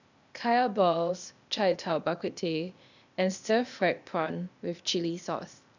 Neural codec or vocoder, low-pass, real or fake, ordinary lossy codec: codec, 16 kHz, 0.8 kbps, ZipCodec; 7.2 kHz; fake; none